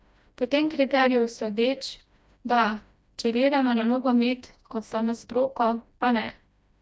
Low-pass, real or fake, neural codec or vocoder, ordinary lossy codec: none; fake; codec, 16 kHz, 1 kbps, FreqCodec, smaller model; none